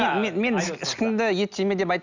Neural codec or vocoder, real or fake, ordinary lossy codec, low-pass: none; real; none; 7.2 kHz